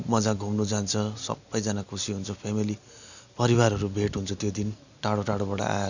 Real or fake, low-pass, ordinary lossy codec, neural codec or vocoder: real; 7.2 kHz; none; none